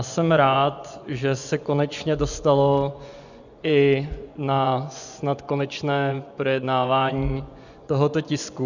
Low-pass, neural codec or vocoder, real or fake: 7.2 kHz; vocoder, 24 kHz, 100 mel bands, Vocos; fake